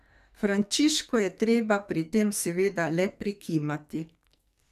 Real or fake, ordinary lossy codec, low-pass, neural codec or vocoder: fake; none; 14.4 kHz; codec, 44.1 kHz, 2.6 kbps, SNAC